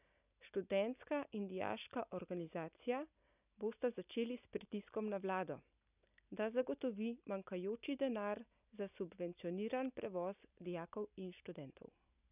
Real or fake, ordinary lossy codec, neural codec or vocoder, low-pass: real; none; none; 3.6 kHz